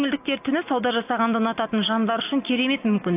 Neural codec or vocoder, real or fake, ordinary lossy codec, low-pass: none; real; AAC, 32 kbps; 3.6 kHz